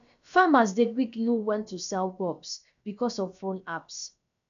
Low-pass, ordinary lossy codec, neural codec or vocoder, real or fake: 7.2 kHz; none; codec, 16 kHz, about 1 kbps, DyCAST, with the encoder's durations; fake